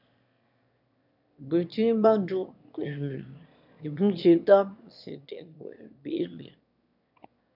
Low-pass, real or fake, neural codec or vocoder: 5.4 kHz; fake; autoencoder, 22.05 kHz, a latent of 192 numbers a frame, VITS, trained on one speaker